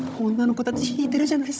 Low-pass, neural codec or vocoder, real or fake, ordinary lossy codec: none; codec, 16 kHz, 16 kbps, FunCodec, trained on Chinese and English, 50 frames a second; fake; none